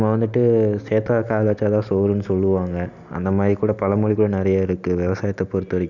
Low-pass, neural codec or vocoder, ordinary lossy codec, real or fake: 7.2 kHz; none; none; real